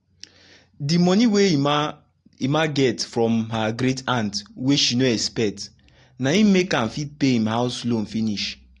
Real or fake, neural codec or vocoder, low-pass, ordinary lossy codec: real; none; 10.8 kHz; AAC, 48 kbps